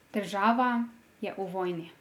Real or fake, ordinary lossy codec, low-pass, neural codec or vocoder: real; none; 19.8 kHz; none